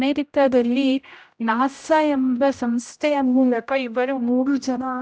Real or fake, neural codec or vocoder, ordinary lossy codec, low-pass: fake; codec, 16 kHz, 0.5 kbps, X-Codec, HuBERT features, trained on general audio; none; none